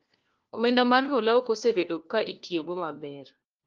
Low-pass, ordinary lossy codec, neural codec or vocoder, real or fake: 7.2 kHz; Opus, 32 kbps; codec, 16 kHz, 1 kbps, FunCodec, trained on LibriTTS, 50 frames a second; fake